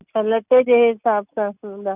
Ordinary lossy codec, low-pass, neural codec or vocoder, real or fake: none; 3.6 kHz; none; real